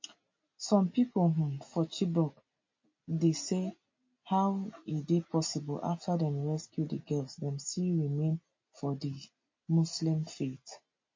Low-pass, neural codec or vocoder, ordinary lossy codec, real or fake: 7.2 kHz; none; MP3, 32 kbps; real